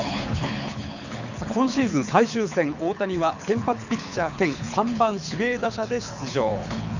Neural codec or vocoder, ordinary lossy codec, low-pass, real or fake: codec, 24 kHz, 6 kbps, HILCodec; none; 7.2 kHz; fake